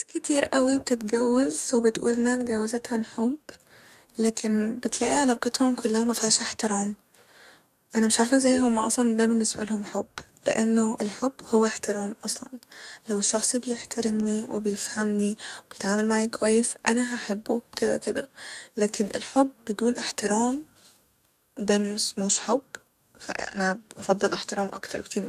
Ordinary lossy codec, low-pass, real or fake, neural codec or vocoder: none; 14.4 kHz; fake; codec, 44.1 kHz, 2.6 kbps, DAC